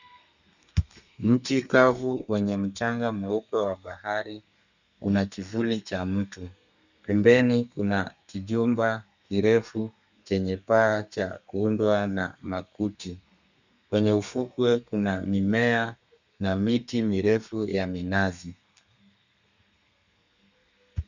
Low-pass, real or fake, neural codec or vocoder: 7.2 kHz; fake; codec, 32 kHz, 1.9 kbps, SNAC